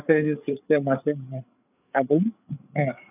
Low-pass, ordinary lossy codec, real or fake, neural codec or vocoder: 3.6 kHz; none; fake; codec, 16 kHz, 8 kbps, FreqCodec, larger model